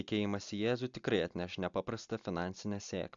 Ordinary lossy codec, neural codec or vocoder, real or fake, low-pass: AAC, 64 kbps; none; real; 7.2 kHz